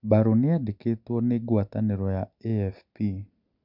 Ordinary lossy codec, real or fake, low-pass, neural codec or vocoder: none; real; 5.4 kHz; none